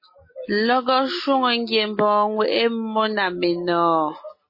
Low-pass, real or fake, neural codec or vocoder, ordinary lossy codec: 5.4 kHz; real; none; MP3, 24 kbps